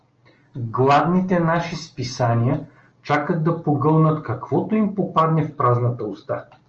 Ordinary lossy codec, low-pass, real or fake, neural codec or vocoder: Opus, 24 kbps; 7.2 kHz; real; none